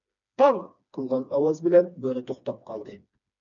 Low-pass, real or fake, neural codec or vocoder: 7.2 kHz; fake; codec, 16 kHz, 2 kbps, FreqCodec, smaller model